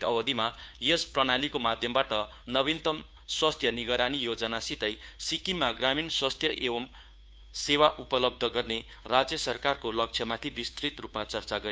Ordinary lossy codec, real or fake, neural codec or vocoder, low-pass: none; fake; codec, 16 kHz, 2 kbps, FunCodec, trained on Chinese and English, 25 frames a second; none